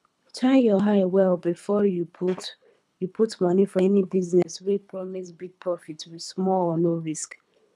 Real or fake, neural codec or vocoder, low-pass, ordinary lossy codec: fake; codec, 24 kHz, 3 kbps, HILCodec; none; none